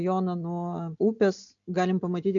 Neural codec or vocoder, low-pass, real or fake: none; 7.2 kHz; real